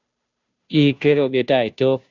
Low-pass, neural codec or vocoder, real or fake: 7.2 kHz; codec, 16 kHz, 0.5 kbps, FunCodec, trained on Chinese and English, 25 frames a second; fake